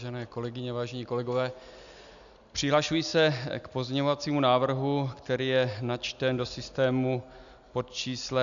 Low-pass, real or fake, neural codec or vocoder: 7.2 kHz; real; none